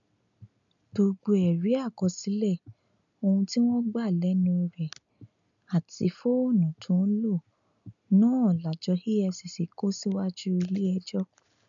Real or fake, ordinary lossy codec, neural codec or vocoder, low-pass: real; none; none; 7.2 kHz